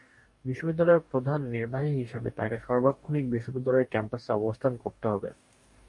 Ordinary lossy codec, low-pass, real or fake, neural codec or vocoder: MP3, 48 kbps; 10.8 kHz; fake; codec, 44.1 kHz, 2.6 kbps, DAC